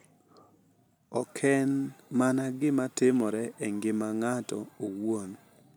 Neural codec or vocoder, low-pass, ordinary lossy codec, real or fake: none; none; none; real